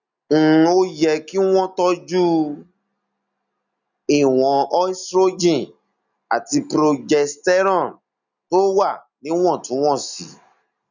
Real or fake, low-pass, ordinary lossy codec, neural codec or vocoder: real; 7.2 kHz; none; none